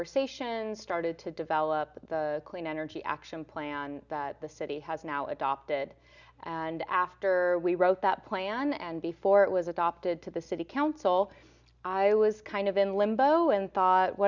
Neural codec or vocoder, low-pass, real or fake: none; 7.2 kHz; real